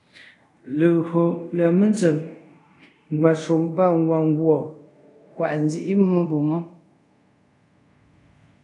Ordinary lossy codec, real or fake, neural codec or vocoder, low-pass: AAC, 48 kbps; fake; codec, 24 kHz, 0.5 kbps, DualCodec; 10.8 kHz